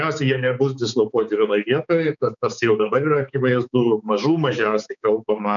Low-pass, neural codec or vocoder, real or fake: 7.2 kHz; codec, 16 kHz, 4 kbps, X-Codec, HuBERT features, trained on balanced general audio; fake